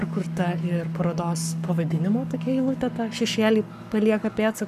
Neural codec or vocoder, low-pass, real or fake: codec, 44.1 kHz, 7.8 kbps, Pupu-Codec; 14.4 kHz; fake